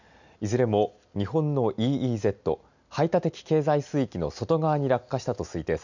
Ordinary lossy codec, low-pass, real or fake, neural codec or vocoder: none; 7.2 kHz; real; none